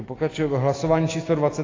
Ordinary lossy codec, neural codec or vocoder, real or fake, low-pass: AAC, 32 kbps; none; real; 7.2 kHz